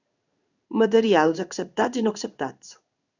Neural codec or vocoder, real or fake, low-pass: codec, 16 kHz in and 24 kHz out, 1 kbps, XY-Tokenizer; fake; 7.2 kHz